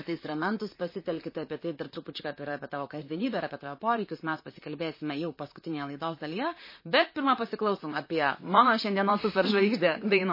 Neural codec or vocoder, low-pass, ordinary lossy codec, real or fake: vocoder, 44.1 kHz, 128 mel bands, Pupu-Vocoder; 5.4 kHz; MP3, 24 kbps; fake